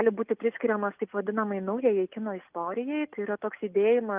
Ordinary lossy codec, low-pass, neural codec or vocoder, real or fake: Opus, 24 kbps; 3.6 kHz; none; real